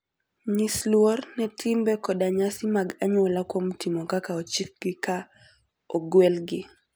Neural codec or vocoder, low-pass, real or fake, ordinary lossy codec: none; none; real; none